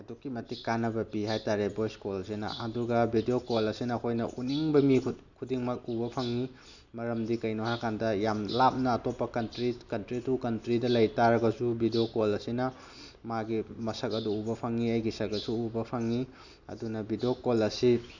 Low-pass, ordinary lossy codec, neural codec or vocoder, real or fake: 7.2 kHz; none; none; real